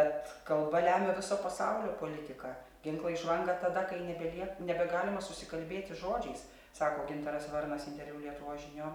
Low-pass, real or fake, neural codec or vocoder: 19.8 kHz; real; none